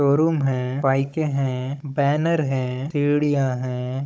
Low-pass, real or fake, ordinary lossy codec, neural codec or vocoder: none; fake; none; codec, 16 kHz, 16 kbps, FunCodec, trained on Chinese and English, 50 frames a second